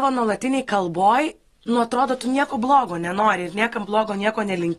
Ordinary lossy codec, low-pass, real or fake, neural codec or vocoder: AAC, 32 kbps; 19.8 kHz; real; none